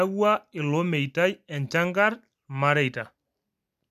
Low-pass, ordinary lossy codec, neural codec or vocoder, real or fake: 14.4 kHz; none; none; real